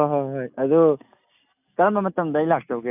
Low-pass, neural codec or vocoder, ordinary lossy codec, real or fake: 3.6 kHz; none; none; real